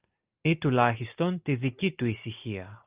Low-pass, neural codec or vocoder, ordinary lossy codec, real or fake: 3.6 kHz; none; Opus, 24 kbps; real